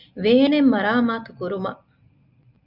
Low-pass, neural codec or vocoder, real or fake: 5.4 kHz; none; real